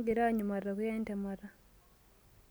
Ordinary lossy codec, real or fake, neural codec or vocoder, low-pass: none; real; none; none